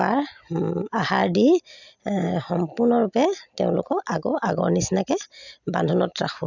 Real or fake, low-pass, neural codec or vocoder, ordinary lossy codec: real; 7.2 kHz; none; none